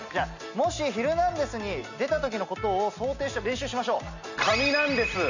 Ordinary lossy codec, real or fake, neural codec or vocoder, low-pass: none; real; none; 7.2 kHz